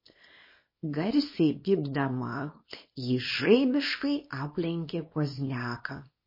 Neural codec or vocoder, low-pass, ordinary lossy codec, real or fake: codec, 24 kHz, 0.9 kbps, WavTokenizer, small release; 5.4 kHz; MP3, 24 kbps; fake